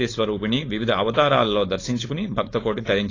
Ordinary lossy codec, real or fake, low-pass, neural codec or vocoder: AAC, 32 kbps; fake; 7.2 kHz; codec, 16 kHz, 4.8 kbps, FACodec